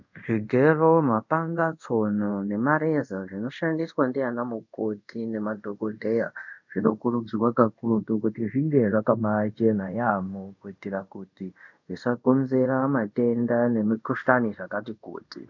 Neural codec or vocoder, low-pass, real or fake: codec, 24 kHz, 0.5 kbps, DualCodec; 7.2 kHz; fake